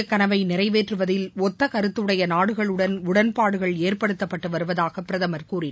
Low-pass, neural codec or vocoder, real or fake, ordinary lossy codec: none; none; real; none